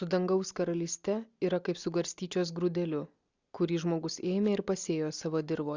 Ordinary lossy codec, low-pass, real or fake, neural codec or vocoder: Opus, 64 kbps; 7.2 kHz; real; none